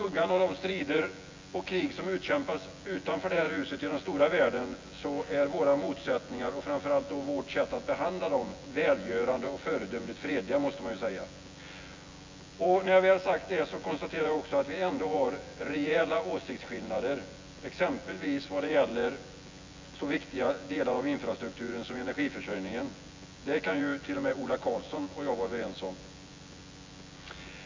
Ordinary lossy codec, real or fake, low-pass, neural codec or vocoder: MP3, 64 kbps; fake; 7.2 kHz; vocoder, 24 kHz, 100 mel bands, Vocos